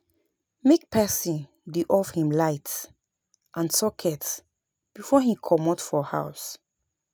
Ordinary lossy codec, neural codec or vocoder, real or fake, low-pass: none; none; real; none